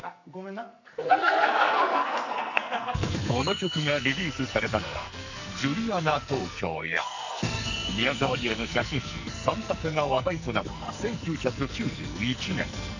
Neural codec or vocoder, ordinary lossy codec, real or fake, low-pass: codec, 44.1 kHz, 2.6 kbps, SNAC; none; fake; 7.2 kHz